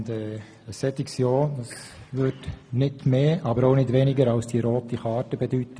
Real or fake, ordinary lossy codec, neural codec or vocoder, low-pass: real; none; none; none